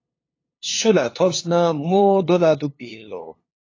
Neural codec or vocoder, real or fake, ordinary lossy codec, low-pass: codec, 16 kHz, 2 kbps, FunCodec, trained on LibriTTS, 25 frames a second; fake; AAC, 32 kbps; 7.2 kHz